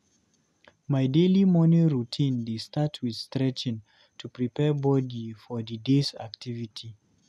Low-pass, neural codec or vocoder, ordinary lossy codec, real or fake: none; none; none; real